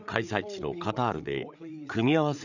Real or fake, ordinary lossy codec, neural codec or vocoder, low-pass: fake; none; codec, 16 kHz, 8 kbps, FreqCodec, larger model; 7.2 kHz